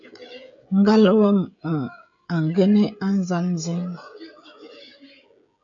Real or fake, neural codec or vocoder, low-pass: fake; codec, 16 kHz, 16 kbps, FreqCodec, smaller model; 7.2 kHz